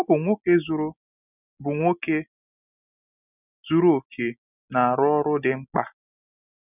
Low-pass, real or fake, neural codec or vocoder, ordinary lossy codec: 3.6 kHz; real; none; none